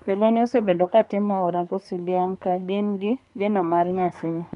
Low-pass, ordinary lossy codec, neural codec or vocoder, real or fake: 10.8 kHz; none; codec, 24 kHz, 1 kbps, SNAC; fake